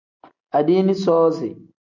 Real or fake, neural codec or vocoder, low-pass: real; none; 7.2 kHz